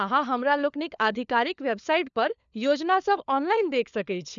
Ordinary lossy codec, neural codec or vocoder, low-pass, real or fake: none; codec, 16 kHz, 4 kbps, FunCodec, trained on LibriTTS, 50 frames a second; 7.2 kHz; fake